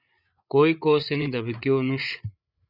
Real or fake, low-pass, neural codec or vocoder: fake; 5.4 kHz; codec, 16 kHz, 8 kbps, FreqCodec, larger model